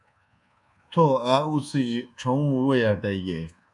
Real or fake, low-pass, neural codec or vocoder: fake; 10.8 kHz; codec, 24 kHz, 1.2 kbps, DualCodec